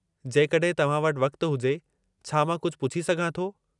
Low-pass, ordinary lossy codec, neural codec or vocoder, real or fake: 10.8 kHz; none; none; real